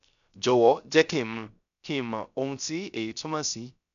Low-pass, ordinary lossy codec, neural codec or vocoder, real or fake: 7.2 kHz; none; codec, 16 kHz, 0.3 kbps, FocalCodec; fake